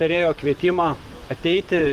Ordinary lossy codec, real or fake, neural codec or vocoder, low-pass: Opus, 24 kbps; fake; vocoder, 44.1 kHz, 128 mel bands, Pupu-Vocoder; 14.4 kHz